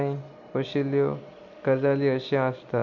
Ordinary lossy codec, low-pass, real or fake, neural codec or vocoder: MP3, 64 kbps; 7.2 kHz; real; none